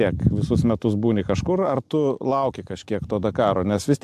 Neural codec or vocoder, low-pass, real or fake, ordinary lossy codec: none; 14.4 kHz; real; AAC, 96 kbps